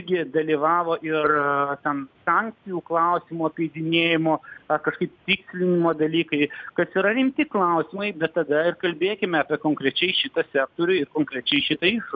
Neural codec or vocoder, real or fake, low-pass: none; real; 7.2 kHz